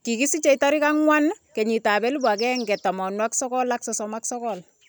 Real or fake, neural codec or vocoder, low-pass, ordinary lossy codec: real; none; none; none